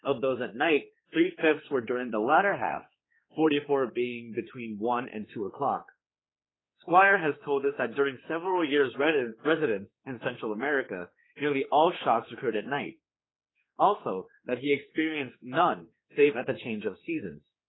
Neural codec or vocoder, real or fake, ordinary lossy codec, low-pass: codec, 16 kHz, 4 kbps, X-Codec, HuBERT features, trained on general audio; fake; AAC, 16 kbps; 7.2 kHz